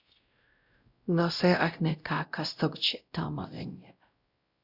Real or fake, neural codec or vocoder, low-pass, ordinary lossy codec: fake; codec, 16 kHz, 0.5 kbps, X-Codec, WavLM features, trained on Multilingual LibriSpeech; 5.4 kHz; Opus, 64 kbps